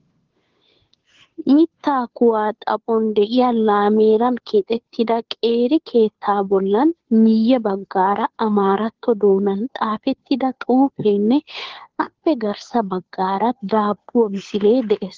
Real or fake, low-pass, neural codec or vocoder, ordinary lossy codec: fake; 7.2 kHz; codec, 16 kHz, 2 kbps, FunCodec, trained on Chinese and English, 25 frames a second; Opus, 16 kbps